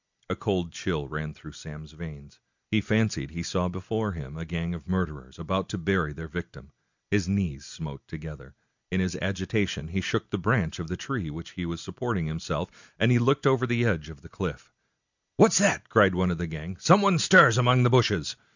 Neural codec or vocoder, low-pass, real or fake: none; 7.2 kHz; real